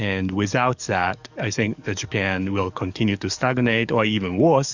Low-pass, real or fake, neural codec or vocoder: 7.2 kHz; fake; codec, 44.1 kHz, 7.8 kbps, DAC